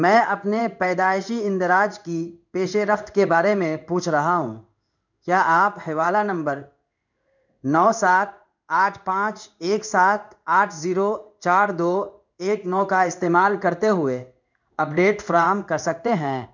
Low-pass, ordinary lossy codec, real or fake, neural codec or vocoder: 7.2 kHz; none; fake; codec, 16 kHz in and 24 kHz out, 1 kbps, XY-Tokenizer